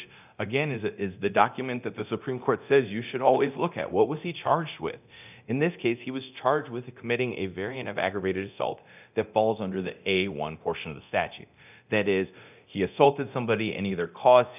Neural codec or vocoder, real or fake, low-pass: codec, 24 kHz, 0.9 kbps, DualCodec; fake; 3.6 kHz